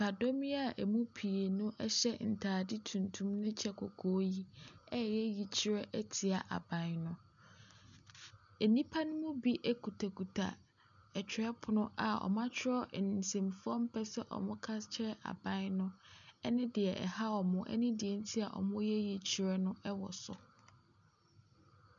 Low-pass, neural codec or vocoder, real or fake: 7.2 kHz; none; real